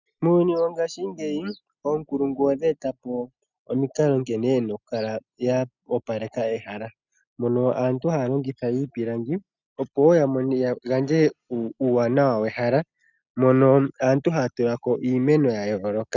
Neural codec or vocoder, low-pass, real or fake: none; 7.2 kHz; real